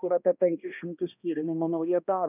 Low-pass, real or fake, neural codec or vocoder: 3.6 kHz; fake; codec, 16 kHz, 1 kbps, X-Codec, HuBERT features, trained on balanced general audio